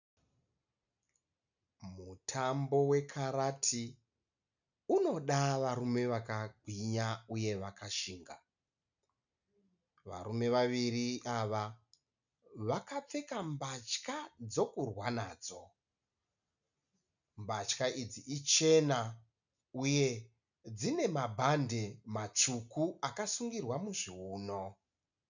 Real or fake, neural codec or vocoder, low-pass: real; none; 7.2 kHz